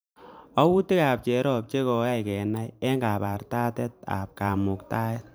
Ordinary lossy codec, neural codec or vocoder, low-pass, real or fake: none; vocoder, 44.1 kHz, 128 mel bands every 512 samples, BigVGAN v2; none; fake